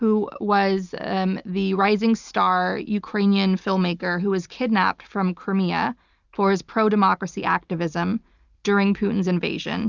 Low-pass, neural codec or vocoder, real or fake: 7.2 kHz; none; real